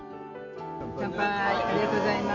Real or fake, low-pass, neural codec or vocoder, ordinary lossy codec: real; 7.2 kHz; none; AAC, 48 kbps